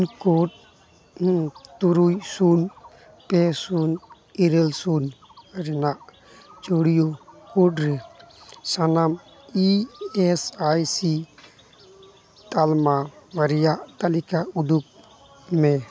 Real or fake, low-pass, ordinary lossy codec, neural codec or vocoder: real; none; none; none